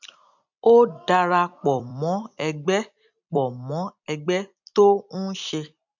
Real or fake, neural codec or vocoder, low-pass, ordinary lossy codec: fake; vocoder, 44.1 kHz, 128 mel bands every 256 samples, BigVGAN v2; 7.2 kHz; none